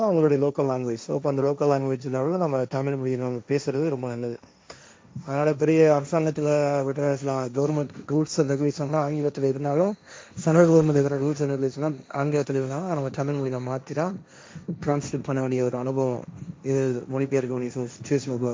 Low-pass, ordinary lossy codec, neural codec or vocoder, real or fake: none; none; codec, 16 kHz, 1.1 kbps, Voila-Tokenizer; fake